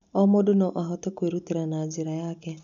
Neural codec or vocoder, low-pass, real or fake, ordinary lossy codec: none; 7.2 kHz; real; none